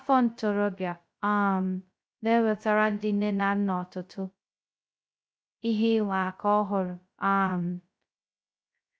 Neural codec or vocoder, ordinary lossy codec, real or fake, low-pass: codec, 16 kHz, 0.2 kbps, FocalCodec; none; fake; none